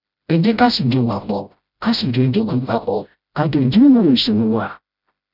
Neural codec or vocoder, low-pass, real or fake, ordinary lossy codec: codec, 16 kHz, 0.5 kbps, FreqCodec, smaller model; 5.4 kHz; fake; none